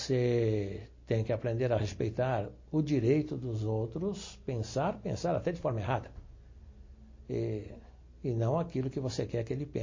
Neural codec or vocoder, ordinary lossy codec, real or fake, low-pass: none; MP3, 32 kbps; real; 7.2 kHz